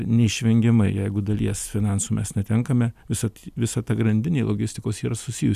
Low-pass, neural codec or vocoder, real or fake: 14.4 kHz; none; real